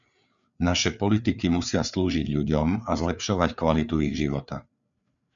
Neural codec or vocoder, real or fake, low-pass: codec, 16 kHz, 4 kbps, FreqCodec, larger model; fake; 7.2 kHz